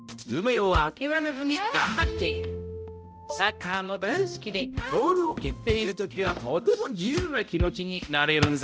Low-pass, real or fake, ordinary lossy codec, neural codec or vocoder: none; fake; none; codec, 16 kHz, 0.5 kbps, X-Codec, HuBERT features, trained on balanced general audio